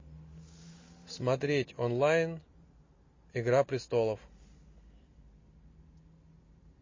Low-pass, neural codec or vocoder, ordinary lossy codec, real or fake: 7.2 kHz; none; MP3, 32 kbps; real